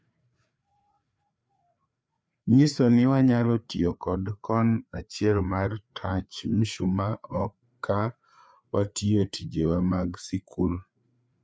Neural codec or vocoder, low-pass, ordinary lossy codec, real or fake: codec, 16 kHz, 4 kbps, FreqCodec, larger model; none; none; fake